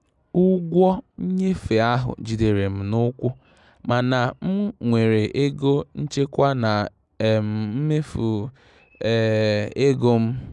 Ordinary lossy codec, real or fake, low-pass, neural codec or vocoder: none; real; 10.8 kHz; none